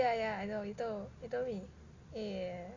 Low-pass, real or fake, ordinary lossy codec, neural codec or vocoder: 7.2 kHz; real; AAC, 32 kbps; none